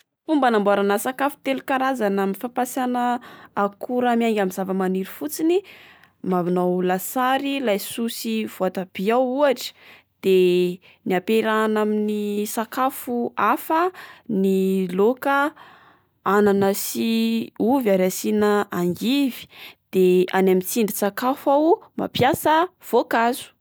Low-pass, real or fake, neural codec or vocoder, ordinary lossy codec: none; real; none; none